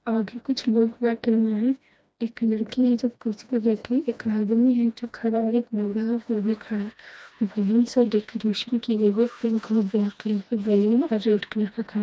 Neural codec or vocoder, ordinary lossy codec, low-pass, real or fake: codec, 16 kHz, 1 kbps, FreqCodec, smaller model; none; none; fake